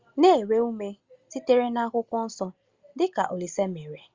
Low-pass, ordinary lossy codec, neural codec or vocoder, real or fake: 7.2 kHz; Opus, 32 kbps; none; real